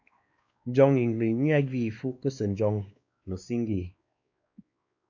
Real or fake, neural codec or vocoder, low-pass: fake; codec, 16 kHz, 2 kbps, X-Codec, WavLM features, trained on Multilingual LibriSpeech; 7.2 kHz